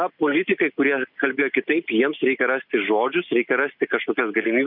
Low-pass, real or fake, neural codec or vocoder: 5.4 kHz; real; none